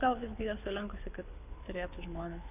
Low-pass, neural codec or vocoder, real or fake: 3.6 kHz; codec, 24 kHz, 6 kbps, HILCodec; fake